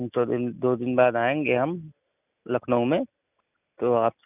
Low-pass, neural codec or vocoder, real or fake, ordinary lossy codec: 3.6 kHz; none; real; none